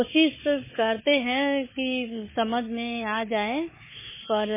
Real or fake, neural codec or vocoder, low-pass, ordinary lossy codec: fake; codec, 16 kHz, 4 kbps, FunCodec, trained on Chinese and English, 50 frames a second; 3.6 kHz; MP3, 16 kbps